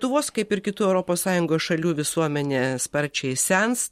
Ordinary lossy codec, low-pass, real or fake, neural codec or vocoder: MP3, 64 kbps; 19.8 kHz; real; none